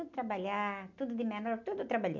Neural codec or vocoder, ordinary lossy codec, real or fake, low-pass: none; none; real; 7.2 kHz